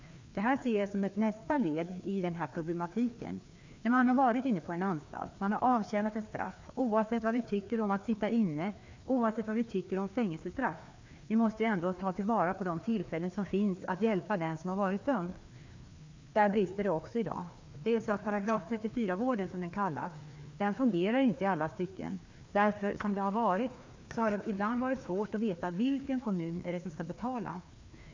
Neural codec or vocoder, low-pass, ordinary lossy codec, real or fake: codec, 16 kHz, 2 kbps, FreqCodec, larger model; 7.2 kHz; MP3, 64 kbps; fake